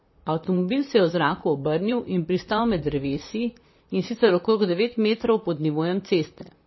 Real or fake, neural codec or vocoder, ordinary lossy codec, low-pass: fake; vocoder, 44.1 kHz, 128 mel bands, Pupu-Vocoder; MP3, 24 kbps; 7.2 kHz